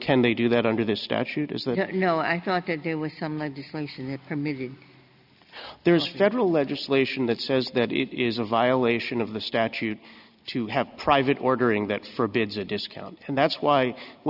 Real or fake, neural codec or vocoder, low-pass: real; none; 5.4 kHz